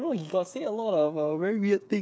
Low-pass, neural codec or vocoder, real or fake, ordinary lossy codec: none; codec, 16 kHz, 8 kbps, FreqCodec, smaller model; fake; none